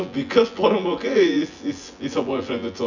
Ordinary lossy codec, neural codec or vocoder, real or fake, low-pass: none; vocoder, 24 kHz, 100 mel bands, Vocos; fake; 7.2 kHz